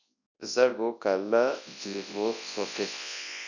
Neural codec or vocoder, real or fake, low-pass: codec, 24 kHz, 0.9 kbps, WavTokenizer, large speech release; fake; 7.2 kHz